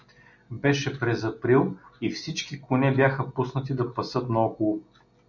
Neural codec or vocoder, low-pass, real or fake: none; 7.2 kHz; real